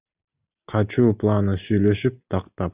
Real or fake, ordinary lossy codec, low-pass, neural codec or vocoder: real; Opus, 64 kbps; 3.6 kHz; none